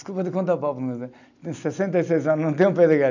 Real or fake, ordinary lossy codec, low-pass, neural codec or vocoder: real; none; 7.2 kHz; none